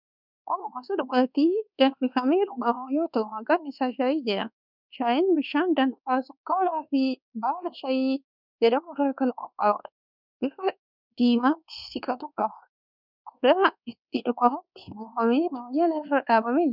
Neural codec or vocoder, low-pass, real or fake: codec, 24 kHz, 1.2 kbps, DualCodec; 5.4 kHz; fake